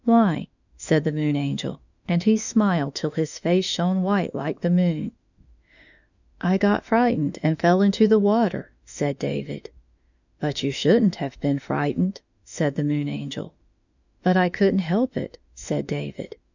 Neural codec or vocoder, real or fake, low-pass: autoencoder, 48 kHz, 32 numbers a frame, DAC-VAE, trained on Japanese speech; fake; 7.2 kHz